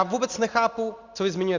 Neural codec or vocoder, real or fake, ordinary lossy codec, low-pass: none; real; Opus, 64 kbps; 7.2 kHz